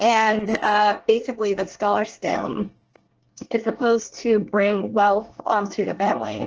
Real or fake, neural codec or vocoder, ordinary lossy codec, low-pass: fake; codec, 24 kHz, 1 kbps, SNAC; Opus, 16 kbps; 7.2 kHz